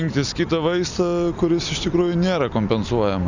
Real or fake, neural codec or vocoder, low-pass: real; none; 7.2 kHz